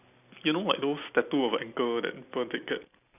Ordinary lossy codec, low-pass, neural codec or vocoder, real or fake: none; 3.6 kHz; none; real